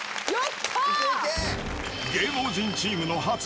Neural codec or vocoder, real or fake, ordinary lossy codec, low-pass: none; real; none; none